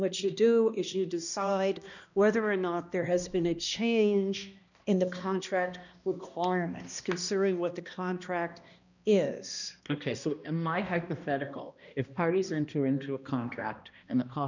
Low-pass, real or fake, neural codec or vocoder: 7.2 kHz; fake; codec, 16 kHz, 1 kbps, X-Codec, HuBERT features, trained on balanced general audio